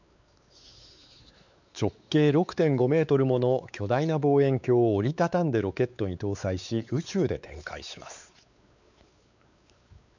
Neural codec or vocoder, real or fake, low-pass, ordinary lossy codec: codec, 16 kHz, 4 kbps, X-Codec, WavLM features, trained on Multilingual LibriSpeech; fake; 7.2 kHz; none